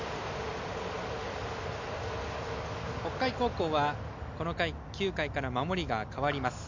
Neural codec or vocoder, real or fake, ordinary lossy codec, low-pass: none; real; MP3, 64 kbps; 7.2 kHz